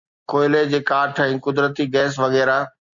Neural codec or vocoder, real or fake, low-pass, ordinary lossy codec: none; real; 7.2 kHz; Opus, 64 kbps